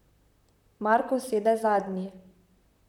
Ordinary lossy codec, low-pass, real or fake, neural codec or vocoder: none; 19.8 kHz; fake; vocoder, 44.1 kHz, 128 mel bands, Pupu-Vocoder